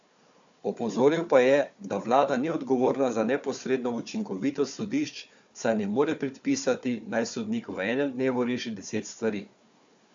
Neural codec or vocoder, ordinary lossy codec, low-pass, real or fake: codec, 16 kHz, 4 kbps, FunCodec, trained on Chinese and English, 50 frames a second; AAC, 64 kbps; 7.2 kHz; fake